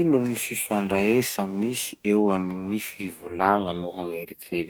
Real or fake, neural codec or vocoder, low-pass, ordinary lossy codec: fake; codec, 44.1 kHz, 2.6 kbps, DAC; none; none